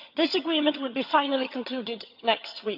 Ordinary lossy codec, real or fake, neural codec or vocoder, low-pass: AAC, 48 kbps; fake; vocoder, 22.05 kHz, 80 mel bands, HiFi-GAN; 5.4 kHz